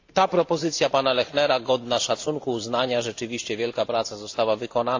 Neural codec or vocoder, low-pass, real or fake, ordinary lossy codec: none; 7.2 kHz; real; AAC, 48 kbps